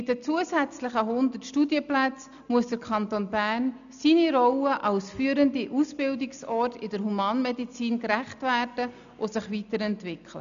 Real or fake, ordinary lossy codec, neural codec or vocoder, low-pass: real; MP3, 96 kbps; none; 7.2 kHz